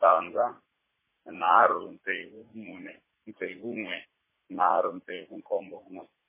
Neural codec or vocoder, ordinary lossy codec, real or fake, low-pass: vocoder, 44.1 kHz, 80 mel bands, Vocos; MP3, 16 kbps; fake; 3.6 kHz